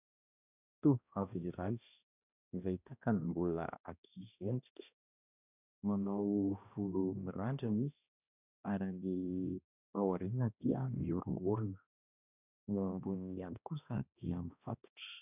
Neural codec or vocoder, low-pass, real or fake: codec, 16 kHz, 1 kbps, X-Codec, HuBERT features, trained on balanced general audio; 3.6 kHz; fake